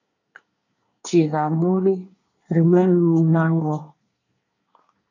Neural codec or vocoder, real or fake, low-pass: codec, 24 kHz, 1 kbps, SNAC; fake; 7.2 kHz